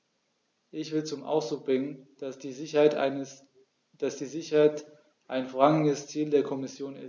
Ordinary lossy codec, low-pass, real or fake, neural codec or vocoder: none; none; real; none